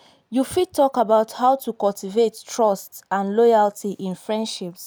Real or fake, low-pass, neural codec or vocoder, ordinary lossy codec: real; none; none; none